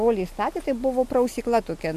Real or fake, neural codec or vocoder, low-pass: real; none; 14.4 kHz